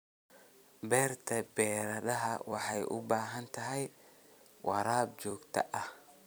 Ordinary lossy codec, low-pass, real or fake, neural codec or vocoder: none; none; fake; vocoder, 44.1 kHz, 128 mel bands every 256 samples, BigVGAN v2